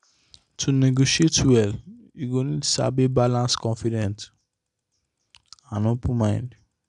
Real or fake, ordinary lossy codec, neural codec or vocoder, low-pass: real; none; none; 10.8 kHz